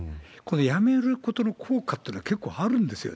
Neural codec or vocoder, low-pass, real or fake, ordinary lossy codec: none; none; real; none